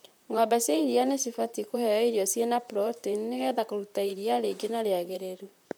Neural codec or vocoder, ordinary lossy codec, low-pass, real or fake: vocoder, 44.1 kHz, 128 mel bands, Pupu-Vocoder; none; none; fake